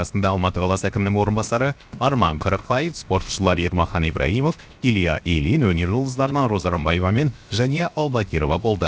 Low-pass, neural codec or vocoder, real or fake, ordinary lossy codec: none; codec, 16 kHz, 0.7 kbps, FocalCodec; fake; none